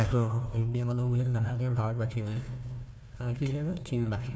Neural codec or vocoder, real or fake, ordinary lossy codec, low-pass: codec, 16 kHz, 1 kbps, FunCodec, trained on Chinese and English, 50 frames a second; fake; none; none